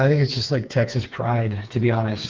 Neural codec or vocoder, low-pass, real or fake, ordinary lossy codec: codec, 16 kHz, 4 kbps, FreqCodec, smaller model; 7.2 kHz; fake; Opus, 32 kbps